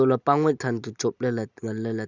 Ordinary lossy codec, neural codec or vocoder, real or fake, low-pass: none; none; real; 7.2 kHz